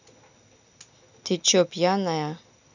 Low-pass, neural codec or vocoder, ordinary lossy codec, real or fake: 7.2 kHz; none; none; real